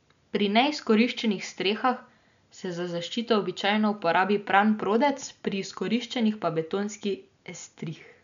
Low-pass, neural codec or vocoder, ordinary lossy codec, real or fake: 7.2 kHz; none; MP3, 96 kbps; real